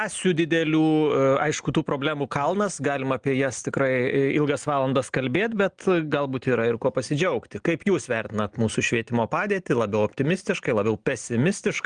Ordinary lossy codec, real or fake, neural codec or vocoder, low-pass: Opus, 24 kbps; real; none; 9.9 kHz